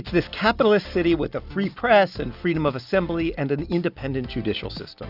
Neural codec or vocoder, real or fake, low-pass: none; real; 5.4 kHz